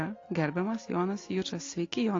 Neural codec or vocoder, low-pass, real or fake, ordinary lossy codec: none; 7.2 kHz; real; AAC, 32 kbps